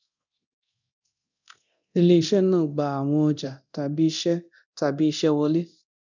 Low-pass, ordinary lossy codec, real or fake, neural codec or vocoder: 7.2 kHz; none; fake; codec, 24 kHz, 0.9 kbps, DualCodec